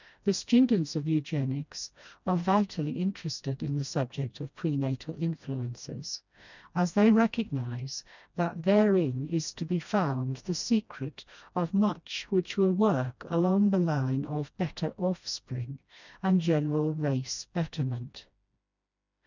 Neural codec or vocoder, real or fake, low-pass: codec, 16 kHz, 1 kbps, FreqCodec, smaller model; fake; 7.2 kHz